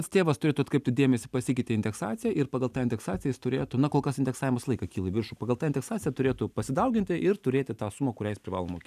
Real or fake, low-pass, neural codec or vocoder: real; 14.4 kHz; none